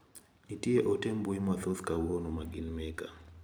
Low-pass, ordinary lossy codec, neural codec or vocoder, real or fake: none; none; vocoder, 44.1 kHz, 128 mel bands every 512 samples, BigVGAN v2; fake